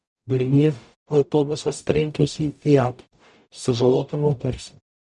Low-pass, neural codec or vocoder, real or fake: 10.8 kHz; codec, 44.1 kHz, 0.9 kbps, DAC; fake